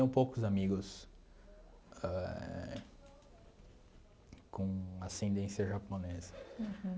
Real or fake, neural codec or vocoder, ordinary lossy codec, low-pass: real; none; none; none